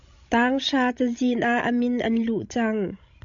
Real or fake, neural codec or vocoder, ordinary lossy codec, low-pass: fake; codec, 16 kHz, 16 kbps, FreqCodec, larger model; MP3, 64 kbps; 7.2 kHz